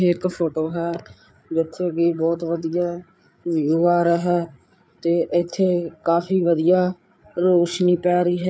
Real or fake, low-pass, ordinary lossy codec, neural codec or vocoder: fake; none; none; codec, 16 kHz, 8 kbps, FreqCodec, larger model